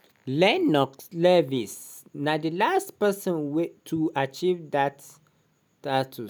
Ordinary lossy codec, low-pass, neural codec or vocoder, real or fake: none; none; none; real